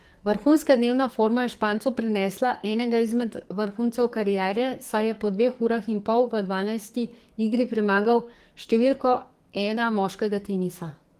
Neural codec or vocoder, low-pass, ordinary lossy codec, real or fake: codec, 44.1 kHz, 2.6 kbps, SNAC; 14.4 kHz; Opus, 24 kbps; fake